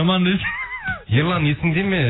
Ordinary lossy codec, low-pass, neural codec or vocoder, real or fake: AAC, 16 kbps; 7.2 kHz; none; real